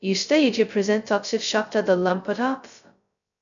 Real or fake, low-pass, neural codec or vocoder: fake; 7.2 kHz; codec, 16 kHz, 0.2 kbps, FocalCodec